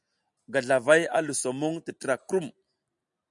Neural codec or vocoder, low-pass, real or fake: none; 10.8 kHz; real